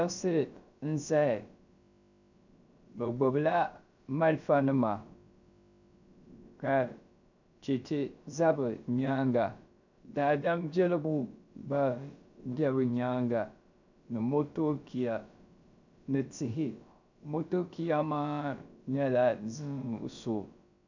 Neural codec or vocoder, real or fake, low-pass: codec, 16 kHz, about 1 kbps, DyCAST, with the encoder's durations; fake; 7.2 kHz